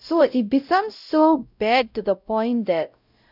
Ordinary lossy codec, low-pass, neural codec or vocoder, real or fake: none; 5.4 kHz; codec, 16 kHz, 0.5 kbps, X-Codec, WavLM features, trained on Multilingual LibriSpeech; fake